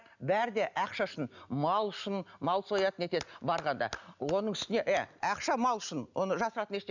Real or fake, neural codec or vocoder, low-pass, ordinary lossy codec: real; none; 7.2 kHz; none